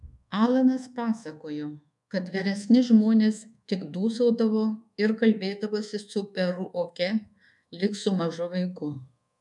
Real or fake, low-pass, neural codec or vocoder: fake; 10.8 kHz; codec, 24 kHz, 1.2 kbps, DualCodec